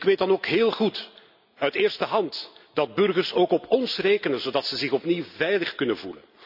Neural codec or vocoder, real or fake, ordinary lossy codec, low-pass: none; real; none; 5.4 kHz